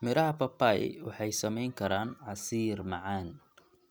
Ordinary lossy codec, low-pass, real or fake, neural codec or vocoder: none; none; real; none